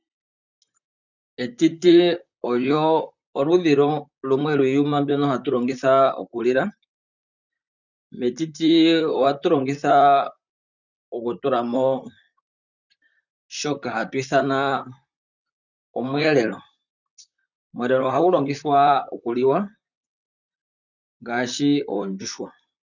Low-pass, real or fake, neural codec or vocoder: 7.2 kHz; fake; vocoder, 44.1 kHz, 128 mel bands, Pupu-Vocoder